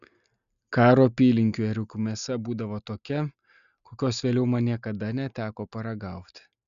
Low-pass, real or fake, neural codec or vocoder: 7.2 kHz; real; none